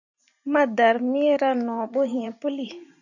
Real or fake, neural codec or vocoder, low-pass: fake; vocoder, 44.1 kHz, 128 mel bands every 512 samples, BigVGAN v2; 7.2 kHz